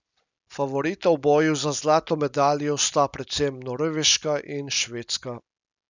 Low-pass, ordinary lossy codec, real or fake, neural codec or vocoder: 7.2 kHz; none; real; none